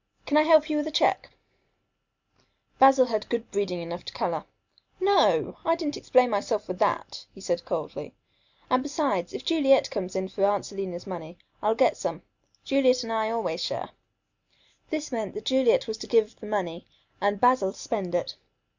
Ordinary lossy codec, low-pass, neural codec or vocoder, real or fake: Opus, 64 kbps; 7.2 kHz; none; real